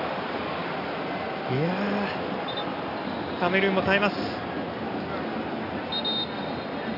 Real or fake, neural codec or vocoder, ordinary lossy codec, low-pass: real; none; AAC, 32 kbps; 5.4 kHz